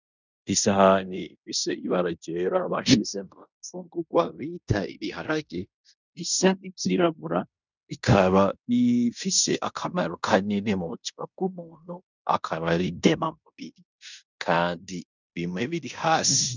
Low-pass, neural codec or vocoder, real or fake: 7.2 kHz; codec, 16 kHz in and 24 kHz out, 0.9 kbps, LongCat-Audio-Codec, fine tuned four codebook decoder; fake